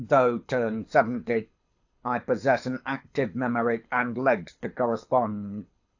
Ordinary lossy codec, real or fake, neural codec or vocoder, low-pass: AAC, 48 kbps; fake; codec, 24 kHz, 6 kbps, HILCodec; 7.2 kHz